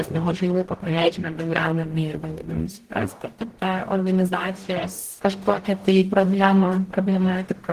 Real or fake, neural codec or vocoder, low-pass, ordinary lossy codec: fake; codec, 44.1 kHz, 0.9 kbps, DAC; 14.4 kHz; Opus, 16 kbps